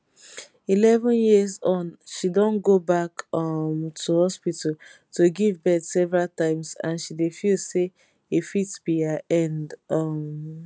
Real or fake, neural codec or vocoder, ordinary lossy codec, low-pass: real; none; none; none